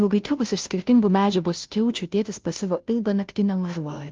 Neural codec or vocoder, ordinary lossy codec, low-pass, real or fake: codec, 16 kHz, 0.5 kbps, FunCodec, trained on Chinese and English, 25 frames a second; Opus, 24 kbps; 7.2 kHz; fake